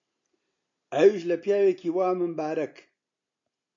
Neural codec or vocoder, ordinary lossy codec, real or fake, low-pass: none; AAC, 48 kbps; real; 7.2 kHz